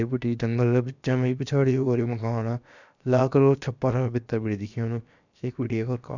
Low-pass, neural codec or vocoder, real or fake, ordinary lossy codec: 7.2 kHz; codec, 16 kHz, about 1 kbps, DyCAST, with the encoder's durations; fake; none